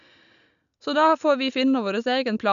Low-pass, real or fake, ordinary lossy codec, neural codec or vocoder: 7.2 kHz; real; none; none